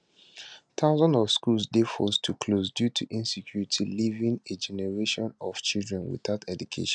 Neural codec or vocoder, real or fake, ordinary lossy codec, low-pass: none; real; none; 9.9 kHz